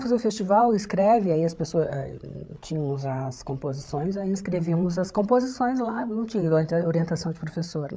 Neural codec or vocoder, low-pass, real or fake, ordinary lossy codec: codec, 16 kHz, 8 kbps, FreqCodec, larger model; none; fake; none